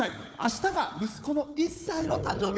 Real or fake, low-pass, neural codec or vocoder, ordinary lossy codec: fake; none; codec, 16 kHz, 4 kbps, FunCodec, trained on Chinese and English, 50 frames a second; none